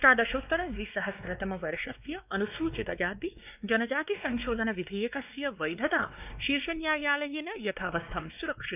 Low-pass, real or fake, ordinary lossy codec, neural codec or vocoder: 3.6 kHz; fake; none; codec, 16 kHz, 2 kbps, X-Codec, WavLM features, trained on Multilingual LibriSpeech